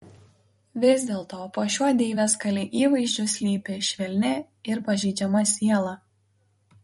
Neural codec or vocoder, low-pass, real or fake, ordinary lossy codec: vocoder, 44.1 kHz, 128 mel bands every 256 samples, BigVGAN v2; 19.8 kHz; fake; MP3, 48 kbps